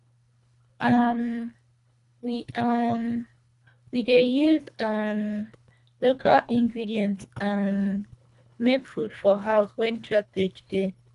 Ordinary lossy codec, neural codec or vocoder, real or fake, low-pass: none; codec, 24 kHz, 1.5 kbps, HILCodec; fake; 10.8 kHz